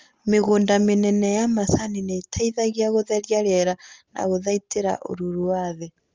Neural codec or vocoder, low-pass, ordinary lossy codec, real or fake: none; 7.2 kHz; Opus, 32 kbps; real